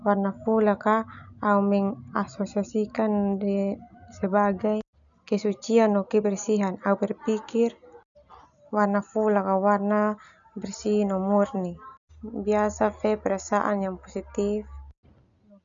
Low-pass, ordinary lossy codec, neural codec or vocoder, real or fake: 7.2 kHz; none; none; real